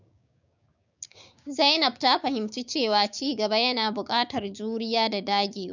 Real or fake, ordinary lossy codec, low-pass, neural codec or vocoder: fake; none; 7.2 kHz; codec, 16 kHz, 6 kbps, DAC